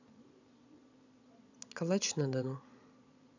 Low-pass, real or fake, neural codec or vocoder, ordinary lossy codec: 7.2 kHz; fake; vocoder, 22.05 kHz, 80 mel bands, Vocos; none